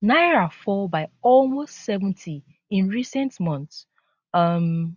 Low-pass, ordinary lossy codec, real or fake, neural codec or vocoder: 7.2 kHz; none; real; none